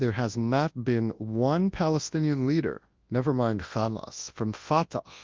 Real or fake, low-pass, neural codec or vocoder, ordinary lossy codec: fake; 7.2 kHz; codec, 24 kHz, 0.9 kbps, WavTokenizer, large speech release; Opus, 32 kbps